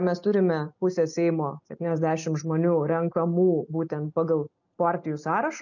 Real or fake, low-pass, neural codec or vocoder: real; 7.2 kHz; none